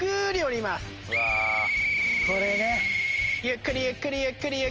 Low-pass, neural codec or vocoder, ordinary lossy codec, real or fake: 7.2 kHz; none; Opus, 24 kbps; real